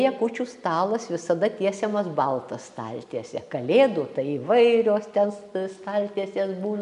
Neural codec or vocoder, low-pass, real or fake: none; 10.8 kHz; real